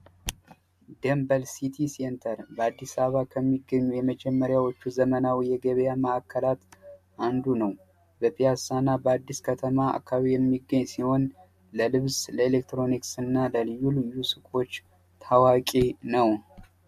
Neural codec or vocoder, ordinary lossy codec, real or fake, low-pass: none; AAC, 96 kbps; real; 14.4 kHz